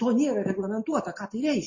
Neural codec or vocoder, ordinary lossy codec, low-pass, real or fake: none; MP3, 32 kbps; 7.2 kHz; real